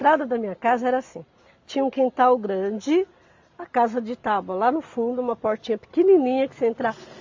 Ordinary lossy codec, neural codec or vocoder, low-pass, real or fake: MP3, 48 kbps; none; 7.2 kHz; real